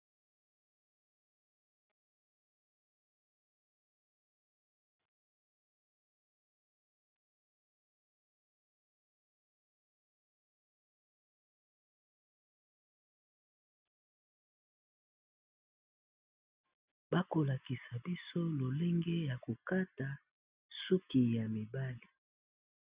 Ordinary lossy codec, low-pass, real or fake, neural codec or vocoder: Opus, 64 kbps; 3.6 kHz; real; none